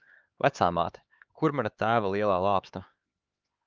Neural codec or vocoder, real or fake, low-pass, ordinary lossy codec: codec, 16 kHz, 4 kbps, X-Codec, HuBERT features, trained on LibriSpeech; fake; 7.2 kHz; Opus, 32 kbps